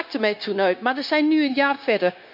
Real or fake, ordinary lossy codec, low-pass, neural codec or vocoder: fake; none; 5.4 kHz; codec, 16 kHz, 0.9 kbps, LongCat-Audio-Codec